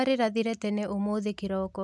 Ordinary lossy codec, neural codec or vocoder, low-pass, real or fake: none; none; none; real